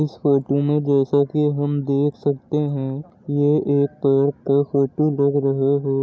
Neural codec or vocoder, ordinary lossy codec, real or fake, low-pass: none; none; real; none